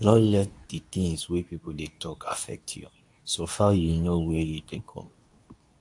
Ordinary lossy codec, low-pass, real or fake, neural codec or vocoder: AAC, 64 kbps; 10.8 kHz; fake; codec, 24 kHz, 0.9 kbps, WavTokenizer, medium speech release version 2